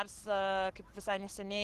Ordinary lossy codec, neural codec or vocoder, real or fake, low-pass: Opus, 16 kbps; codec, 44.1 kHz, 7.8 kbps, Pupu-Codec; fake; 14.4 kHz